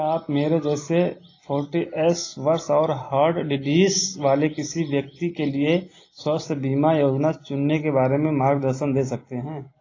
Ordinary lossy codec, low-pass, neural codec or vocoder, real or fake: AAC, 32 kbps; 7.2 kHz; none; real